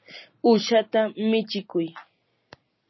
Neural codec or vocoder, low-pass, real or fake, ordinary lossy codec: none; 7.2 kHz; real; MP3, 24 kbps